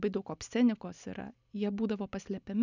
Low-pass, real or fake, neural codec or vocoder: 7.2 kHz; real; none